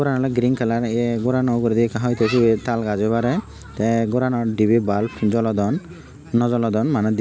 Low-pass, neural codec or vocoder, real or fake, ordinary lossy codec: none; none; real; none